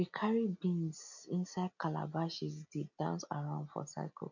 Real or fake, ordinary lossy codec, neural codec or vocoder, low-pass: real; none; none; 7.2 kHz